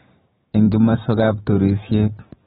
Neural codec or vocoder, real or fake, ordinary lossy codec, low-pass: codec, 16 kHz, 6 kbps, DAC; fake; AAC, 16 kbps; 7.2 kHz